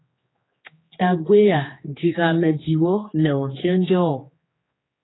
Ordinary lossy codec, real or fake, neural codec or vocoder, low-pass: AAC, 16 kbps; fake; codec, 16 kHz, 2 kbps, X-Codec, HuBERT features, trained on general audio; 7.2 kHz